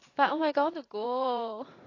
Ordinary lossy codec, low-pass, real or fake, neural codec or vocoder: AAC, 32 kbps; 7.2 kHz; fake; vocoder, 44.1 kHz, 80 mel bands, Vocos